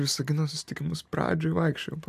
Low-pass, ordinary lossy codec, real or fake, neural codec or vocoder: 14.4 kHz; AAC, 96 kbps; real; none